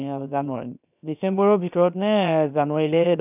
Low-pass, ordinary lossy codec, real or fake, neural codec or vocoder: 3.6 kHz; none; fake; codec, 16 kHz, 0.7 kbps, FocalCodec